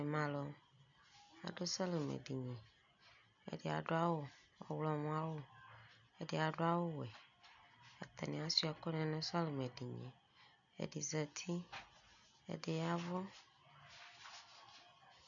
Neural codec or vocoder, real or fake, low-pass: none; real; 7.2 kHz